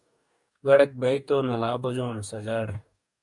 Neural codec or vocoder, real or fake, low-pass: codec, 44.1 kHz, 2.6 kbps, DAC; fake; 10.8 kHz